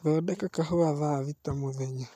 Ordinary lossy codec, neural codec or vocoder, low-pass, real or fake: none; none; 14.4 kHz; real